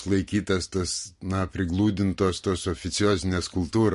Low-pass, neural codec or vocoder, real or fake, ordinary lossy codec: 10.8 kHz; none; real; MP3, 48 kbps